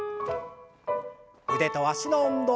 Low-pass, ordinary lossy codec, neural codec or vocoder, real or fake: none; none; none; real